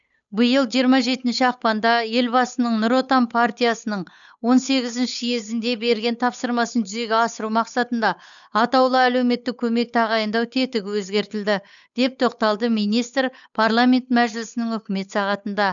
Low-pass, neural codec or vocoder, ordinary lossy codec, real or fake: 7.2 kHz; codec, 16 kHz, 8 kbps, FunCodec, trained on Chinese and English, 25 frames a second; none; fake